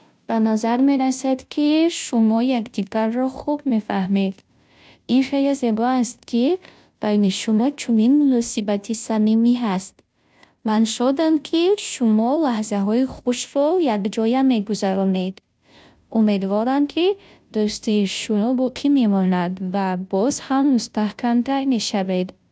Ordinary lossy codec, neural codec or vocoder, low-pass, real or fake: none; codec, 16 kHz, 0.5 kbps, FunCodec, trained on Chinese and English, 25 frames a second; none; fake